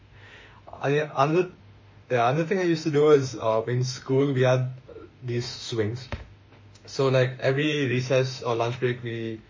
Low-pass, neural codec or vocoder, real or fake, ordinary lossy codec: 7.2 kHz; autoencoder, 48 kHz, 32 numbers a frame, DAC-VAE, trained on Japanese speech; fake; MP3, 32 kbps